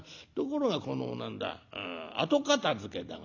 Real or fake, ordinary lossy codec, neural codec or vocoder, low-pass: real; none; none; 7.2 kHz